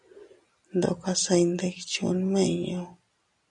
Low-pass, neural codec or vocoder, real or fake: 10.8 kHz; none; real